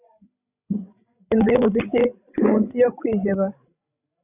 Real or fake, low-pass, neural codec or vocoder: fake; 3.6 kHz; codec, 16 kHz, 16 kbps, FreqCodec, larger model